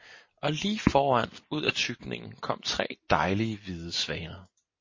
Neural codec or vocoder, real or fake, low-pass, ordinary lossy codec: none; real; 7.2 kHz; MP3, 32 kbps